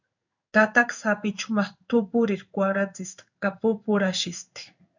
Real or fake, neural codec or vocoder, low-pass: fake; codec, 16 kHz in and 24 kHz out, 1 kbps, XY-Tokenizer; 7.2 kHz